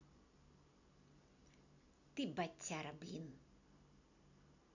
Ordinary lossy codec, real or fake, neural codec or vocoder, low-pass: none; real; none; 7.2 kHz